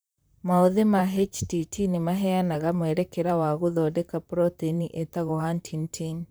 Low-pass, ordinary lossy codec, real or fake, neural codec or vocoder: none; none; fake; vocoder, 44.1 kHz, 128 mel bands, Pupu-Vocoder